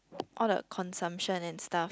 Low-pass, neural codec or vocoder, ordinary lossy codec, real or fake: none; none; none; real